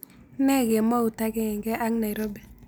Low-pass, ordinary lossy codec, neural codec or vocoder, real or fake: none; none; none; real